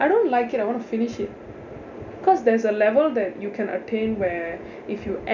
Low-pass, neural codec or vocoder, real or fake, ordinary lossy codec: 7.2 kHz; none; real; none